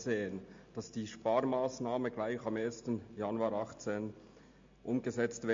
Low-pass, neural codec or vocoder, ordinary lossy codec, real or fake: 7.2 kHz; none; MP3, 48 kbps; real